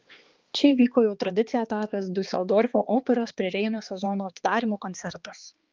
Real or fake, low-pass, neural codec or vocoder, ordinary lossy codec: fake; 7.2 kHz; codec, 16 kHz, 2 kbps, X-Codec, HuBERT features, trained on balanced general audio; Opus, 24 kbps